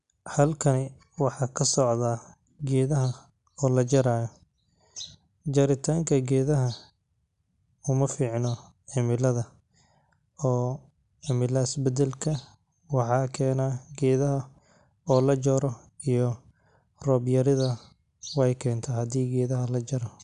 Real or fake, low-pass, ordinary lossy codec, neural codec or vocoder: real; 10.8 kHz; none; none